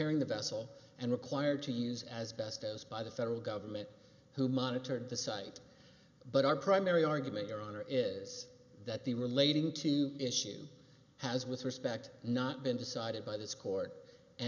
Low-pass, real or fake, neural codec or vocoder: 7.2 kHz; real; none